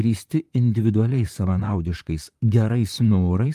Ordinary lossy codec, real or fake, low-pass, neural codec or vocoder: Opus, 32 kbps; fake; 14.4 kHz; vocoder, 44.1 kHz, 128 mel bands, Pupu-Vocoder